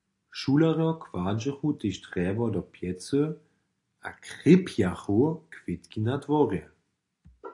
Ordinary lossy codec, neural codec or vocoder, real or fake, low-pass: AAC, 48 kbps; none; real; 10.8 kHz